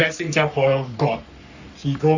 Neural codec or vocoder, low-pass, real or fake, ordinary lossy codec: codec, 44.1 kHz, 3.4 kbps, Pupu-Codec; 7.2 kHz; fake; Opus, 64 kbps